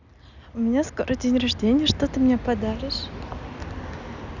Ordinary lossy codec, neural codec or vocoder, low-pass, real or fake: none; none; 7.2 kHz; real